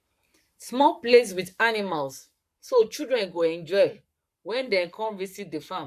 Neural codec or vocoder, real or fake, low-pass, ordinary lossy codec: codec, 44.1 kHz, 7.8 kbps, Pupu-Codec; fake; 14.4 kHz; none